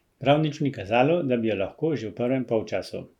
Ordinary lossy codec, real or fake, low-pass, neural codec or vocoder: none; real; 19.8 kHz; none